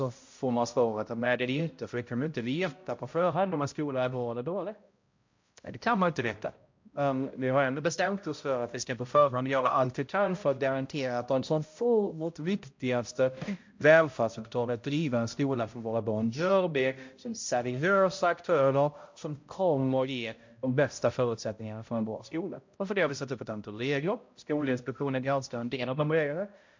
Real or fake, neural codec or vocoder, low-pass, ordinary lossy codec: fake; codec, 16 kHz, 0.5 kbps, X-Codec, HuBERT features, trained on balanced general audio; 7.2 kHz; MP3, 48 kbps